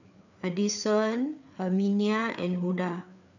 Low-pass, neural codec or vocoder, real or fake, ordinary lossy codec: 7.2 kHz; codec, 16 kHz, 4 kbps, FreqCodec, larger model; fake; none